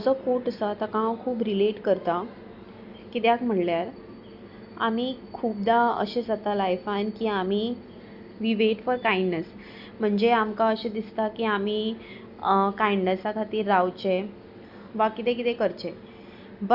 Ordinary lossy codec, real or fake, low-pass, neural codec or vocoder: Opus, 64 kbps; real; 5.4 kHz; none